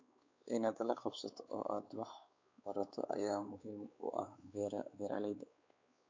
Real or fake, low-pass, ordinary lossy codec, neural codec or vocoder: fake; 7.2 kHz; MP3, 64 kbps; codec, 16 kHz, 4 kbps, X-Codec, WavLM features, trained on Multilingual LibriSpeech